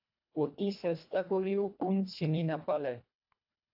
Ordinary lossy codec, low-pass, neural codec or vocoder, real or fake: AAC, 48 kbps; 5.4 kHz; codec, 24 kHz, 1.5 kbps, HILCodec; fake